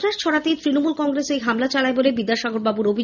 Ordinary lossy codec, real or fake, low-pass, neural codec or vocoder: none; real; 7.2 kHz; none